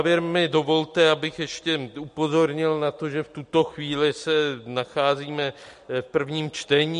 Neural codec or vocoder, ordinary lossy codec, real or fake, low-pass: none; MP3, 48 kbps; real; 14.4 kHz